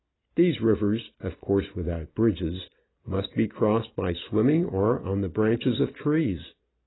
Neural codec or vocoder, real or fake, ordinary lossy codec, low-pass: none; real; AAC, 16 kbps; 7.2 kHz